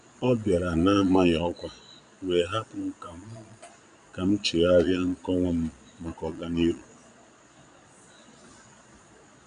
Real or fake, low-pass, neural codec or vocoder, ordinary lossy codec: fake; 9.9 kHz; vocoder, 22.05 kHz, 80 mel bands, Vocos; none